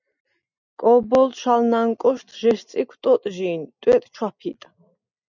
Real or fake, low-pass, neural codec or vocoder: real; 7.2 kHz; none